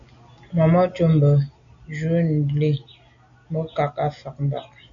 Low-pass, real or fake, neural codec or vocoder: 7.2 kHz; real; none